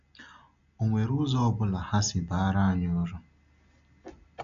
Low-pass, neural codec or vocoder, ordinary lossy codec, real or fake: 7.2 kHz; none; none; real